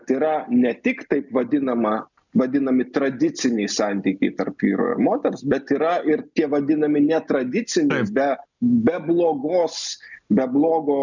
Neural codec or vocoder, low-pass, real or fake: none; 7.2 kHz; real